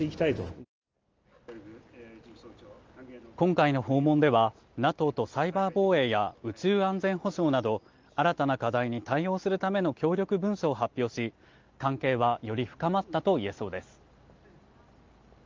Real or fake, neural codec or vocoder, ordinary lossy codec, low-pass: real; none; Opus, 24 kbps; 7.2 kHz